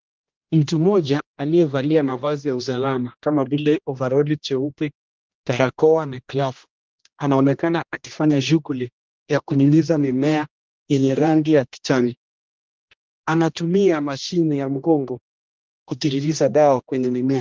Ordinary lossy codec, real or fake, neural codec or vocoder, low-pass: Opus, 24 kbps; fake; codec, 16 kHz, 1 kbps, X-Codec, HuBERT features, trained on general audio; 7.2 kHz